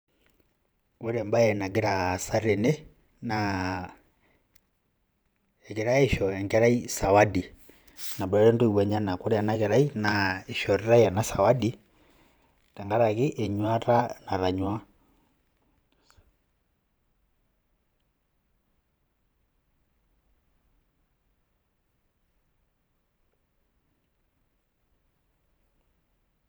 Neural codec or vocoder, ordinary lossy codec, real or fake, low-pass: vocoder, 44.1 kHz, 128 mel bands every 512 samples, BigVGAN v2; none; fake; none